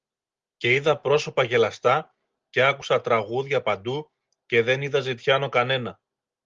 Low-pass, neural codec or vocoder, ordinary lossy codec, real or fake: 7.2 kHz; none; Opus, 32 kbps; real